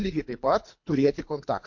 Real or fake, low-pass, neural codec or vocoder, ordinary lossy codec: fake; 7.2 kHz; codec, 24 kHz, 3 kbps, HILCodec; MP3, 48 kbps